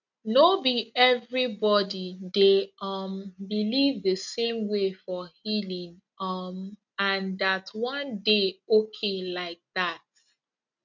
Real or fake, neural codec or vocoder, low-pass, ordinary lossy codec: real; none; 7.2 kHz; none